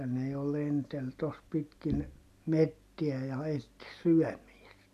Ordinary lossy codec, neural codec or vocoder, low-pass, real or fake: none; none; 14.4 kHz; real